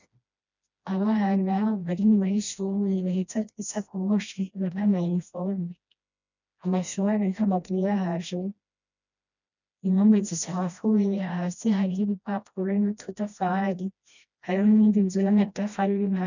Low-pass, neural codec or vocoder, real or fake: 7.2 kHz; codec, 16 kHz, 1 kbps, FreqCodec, smaller model; fake